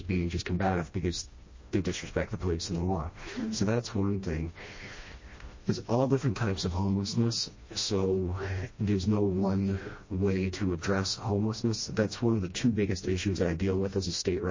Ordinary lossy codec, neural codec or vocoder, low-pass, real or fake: MP3, 32 kbps; codec, 16 kHz, 1 kbps, FreqCodec, smaller model; 7.2 kHz; fake